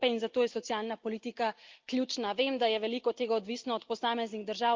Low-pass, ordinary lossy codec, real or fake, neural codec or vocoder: 7.2 kHz; Opus, 32 kbps; real; none